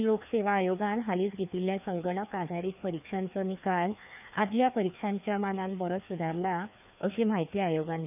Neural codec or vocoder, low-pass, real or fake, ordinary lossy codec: codec, 16 kHz, 2 kbps, FreqCodec, larger model; 3.6 kHz; fake; none